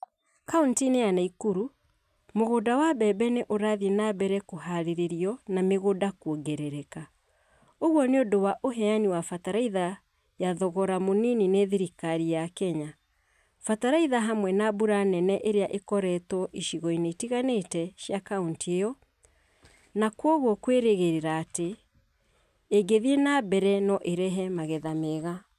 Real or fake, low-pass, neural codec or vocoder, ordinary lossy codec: real; 14.4 kHz; none; none